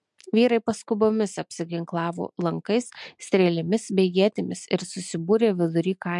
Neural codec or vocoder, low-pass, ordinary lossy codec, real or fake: none; 10.8 kHz; MP3, 64 kbps; real